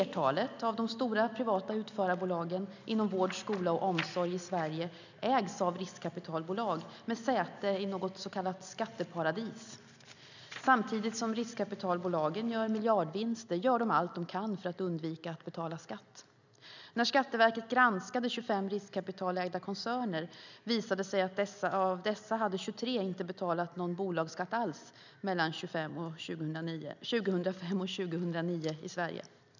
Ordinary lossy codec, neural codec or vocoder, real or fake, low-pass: none; none; real; 7.2 kHz